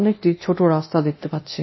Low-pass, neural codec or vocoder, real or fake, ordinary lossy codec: 7.2 kHz; codec, 24 kHz, 0.9 kbps, DualCodec; fake; MP3, 24 kbps